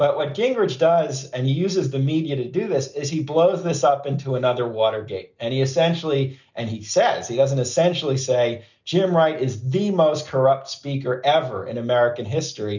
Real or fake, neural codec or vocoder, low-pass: real; none; 7.2 kHz